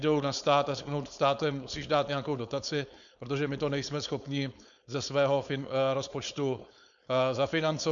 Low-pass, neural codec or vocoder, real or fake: 7.2 kHz; codec, 16 kHz, 4.8 kbps, FACodec; fake